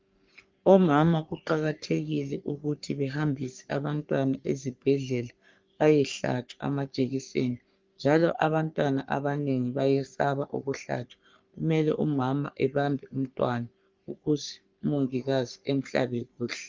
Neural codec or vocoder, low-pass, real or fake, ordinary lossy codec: codec, 44.1 kHz, 3.4 kbps, Pupu-Codec; 7.2 kHz; fake; Opus, 24 kbps